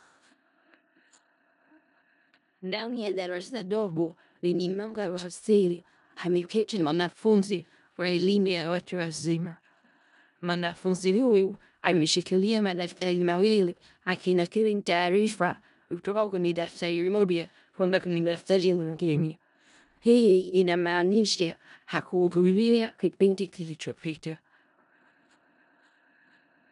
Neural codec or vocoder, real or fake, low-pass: codec, 16 kHz in and 24 kHz out, 0.4 kbps, LongCat-Audio-Codec, four codebook decoder; fake; 10.8 kHz